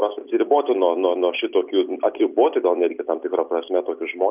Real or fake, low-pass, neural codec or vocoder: real; 3.6 kHz; none